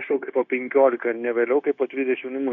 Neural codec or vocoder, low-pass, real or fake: codec, 16 kHz, 0.9 kbps, LongCat-Audio-Codec; 7.2 kHz; fake